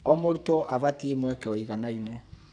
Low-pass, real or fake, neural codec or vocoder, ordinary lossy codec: 9.9 kHz; fake; codec, 44.1 kHz, 2.6 kbps, SNAC; none